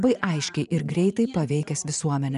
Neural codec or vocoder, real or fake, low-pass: vocoder, 24 kHz, 100 mel bands, Vocos; fake; 10.8 kHz